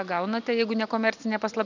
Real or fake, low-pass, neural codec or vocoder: real; 7.2 kHz; none